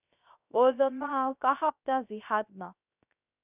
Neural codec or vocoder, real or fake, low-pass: codec, 16 kHz, 0.3 kbps, FocalCodec; fake; 3.6 kHz